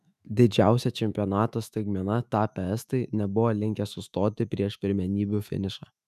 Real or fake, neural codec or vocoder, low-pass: fake; autoencoder, 48 kHz, 128 numbers a frame, DAC-VAE, trained on Japanese speech; 14.4 kHz